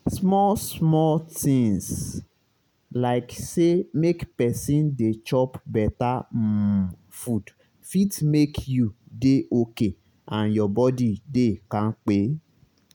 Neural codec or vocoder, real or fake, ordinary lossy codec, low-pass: none; real; none; none